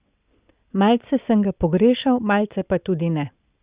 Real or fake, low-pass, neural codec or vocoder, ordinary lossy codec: real; 3.6 kHz; none; Opus, 64 kbps